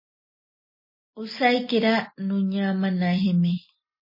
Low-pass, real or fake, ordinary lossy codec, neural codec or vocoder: 5.4 kHz; real; MP3, 24 kbps; none